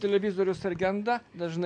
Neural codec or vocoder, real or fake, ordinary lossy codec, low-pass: none; real; AAC, 64 kbps; 9.9 kHz